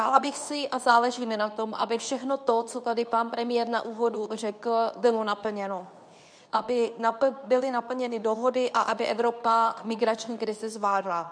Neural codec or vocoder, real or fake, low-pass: codec, 24 kHz, 0.9 kbps, WavTokenizer, medium speech release version 2; fake; 9.9 kHz